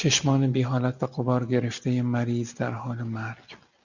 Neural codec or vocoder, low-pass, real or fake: none; 7.2 kHz; real